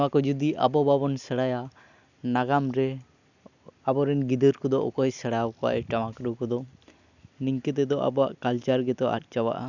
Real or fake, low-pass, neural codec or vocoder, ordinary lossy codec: real; 7.2 kHz; none; none